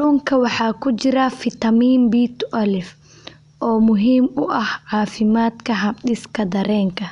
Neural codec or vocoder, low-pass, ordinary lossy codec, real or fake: none; 10.8 kHz; none; real